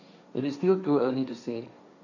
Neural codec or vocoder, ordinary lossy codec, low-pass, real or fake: codec, 16 kHz, 1.1 kbps, Voila-Tokenizer; none; 7.2 kHz; fake